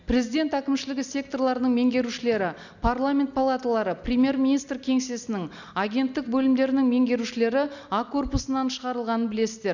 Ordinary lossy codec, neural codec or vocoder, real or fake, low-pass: none; none; real; 7.2 kHz